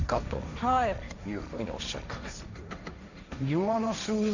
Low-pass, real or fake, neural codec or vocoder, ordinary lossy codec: 7.2 kHz; fake; codec, 16 kHz, 1.1 kbps, Voila-Tokenizer; none